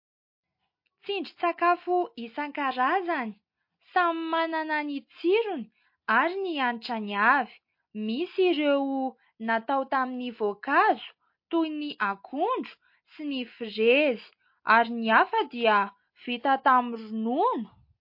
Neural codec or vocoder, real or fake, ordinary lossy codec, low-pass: none; real; MP3, 32 kbps; 5.4 kHz